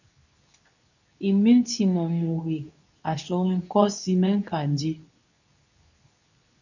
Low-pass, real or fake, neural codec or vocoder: 7.2 kHz; fake; codec, 24 kHz, 0.9 kbps, WavTokenizer, medium speech release version 2